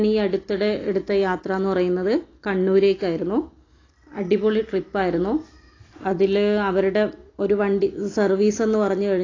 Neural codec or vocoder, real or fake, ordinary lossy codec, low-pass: none; real; AAC, 32 kbps; 7.2 kHz